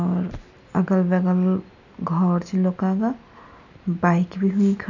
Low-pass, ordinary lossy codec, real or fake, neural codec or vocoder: 7.2 kHz; none; real; none